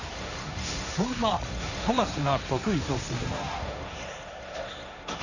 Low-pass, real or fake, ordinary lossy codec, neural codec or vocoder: 7.2 kHz; fake; none; codec, 16 kHz, 1.1 kbps, Voila-Tokenizer